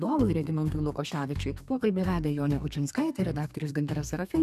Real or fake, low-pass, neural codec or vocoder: fake; 14.4 kHz; codec, 32 kHz, 1.9 kbps, SNAC